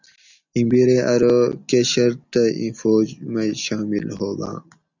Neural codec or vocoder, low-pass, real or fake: none; 7.2 kHz; real